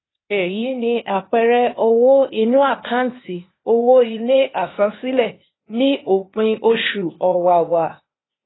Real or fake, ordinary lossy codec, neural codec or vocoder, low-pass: fake; AAC, 16 kbps; codec, 16 kHz, 0.8 kbps, ZipCodec; 7.2 kHz